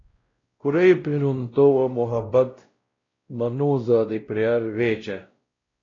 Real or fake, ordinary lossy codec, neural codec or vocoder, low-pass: fake; AAC, 32 kbps; codec, 16 kHz, 0.5 kbps, X-Codec, WavLM features, trained on Multilingual LibriSpeech; 7.2 kHz